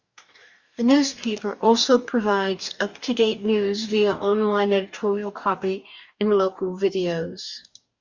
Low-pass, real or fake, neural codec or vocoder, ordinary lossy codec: 7.2 kHz; fake; codec, 44.1 kHz, 2.6 kbps, DAC; Opus, 64 kbps